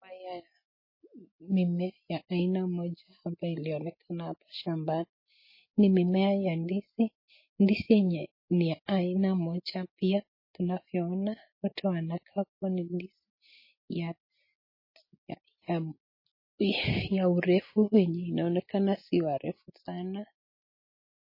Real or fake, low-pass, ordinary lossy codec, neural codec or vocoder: real; 5.4 kHz; MP3, 24 kbps; none